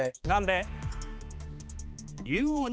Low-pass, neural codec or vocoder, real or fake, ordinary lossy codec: none; codec, 16 kHz, 2 kbps, X-Codec, HuBERT features, trained on balanced general audio; fake; none